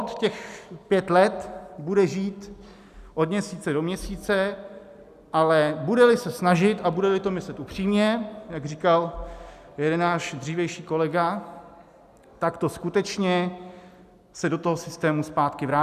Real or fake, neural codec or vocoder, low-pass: real; none; 14.4 kHz